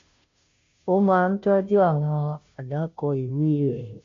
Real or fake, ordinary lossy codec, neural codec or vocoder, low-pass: fake; AAC, 48 kbps; codec, 16 kHz, 0.5 kbps, FunCodec, trained on Chinese and English, 25 frames a second; 7.2 kHz